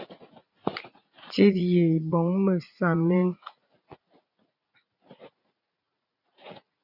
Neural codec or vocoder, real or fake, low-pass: vocoder, 44.1 kHz, 80 mel bands, Vocos; fake; 5.4 kHz